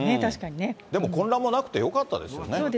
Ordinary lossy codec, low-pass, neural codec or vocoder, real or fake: none; none; none; real